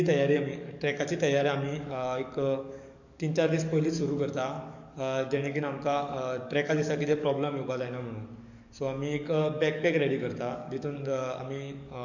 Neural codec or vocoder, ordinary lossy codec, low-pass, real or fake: codec, 44.1 kHz, 7.8 kbps, DAC; none; 7.2 kHz; fake